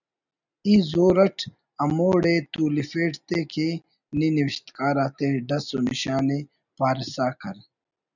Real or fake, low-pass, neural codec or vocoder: real; 7.2 kHz; none